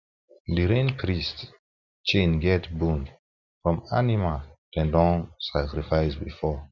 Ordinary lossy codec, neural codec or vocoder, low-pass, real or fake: none; none; 7.2 kHz; real